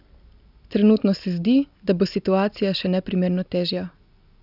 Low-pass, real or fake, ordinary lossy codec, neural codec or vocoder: 5.4 kHz; real; none; none